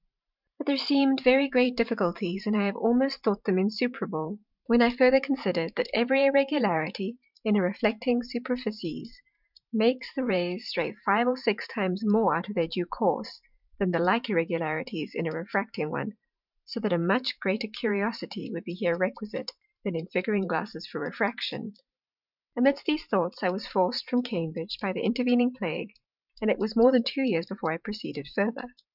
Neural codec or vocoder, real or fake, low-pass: vocoder, 44.1 kHz, 128 mel bands every 512 samples, BigVGAN v2; fake; 5.4 kHz